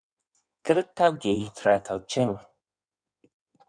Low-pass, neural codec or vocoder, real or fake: 9.9 kHz; codec, 16 kHz in and 24 kHz out, 1.1 kbps, FireRedTTS-2 codec; fake